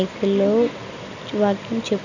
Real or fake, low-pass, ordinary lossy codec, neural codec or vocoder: real; 7.2 kHz; none; none